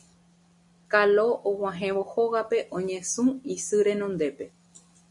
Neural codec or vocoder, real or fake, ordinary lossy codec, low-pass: none; real; MP3, 48 kbps; 10.8 kHz